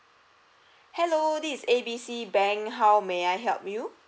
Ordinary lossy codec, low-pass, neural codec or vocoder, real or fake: none; none; none; real